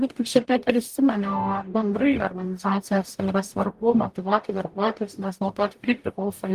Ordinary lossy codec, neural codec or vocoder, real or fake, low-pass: Opus, 24 kbps; codec, 44.1 kHz, 0.9 kbps, DAC; fake; 14.4 kHz